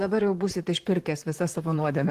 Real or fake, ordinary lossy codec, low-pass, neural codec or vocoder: fake; Opus, 16 kbps; 14.4 kHz; vocoder, 44.1 kHz, 128 mel bands, Pupu-Vocoder